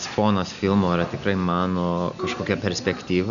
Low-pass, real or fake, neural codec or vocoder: 7.2 kHz; real; none